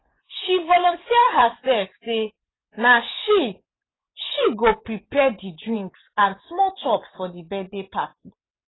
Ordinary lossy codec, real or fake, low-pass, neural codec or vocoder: AAC, 16 kbps; fake; 7.2 kHz; vocoder, 24 kHz, 100 mel bands, Vocos